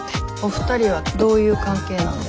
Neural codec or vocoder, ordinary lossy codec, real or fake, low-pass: none; none; real; none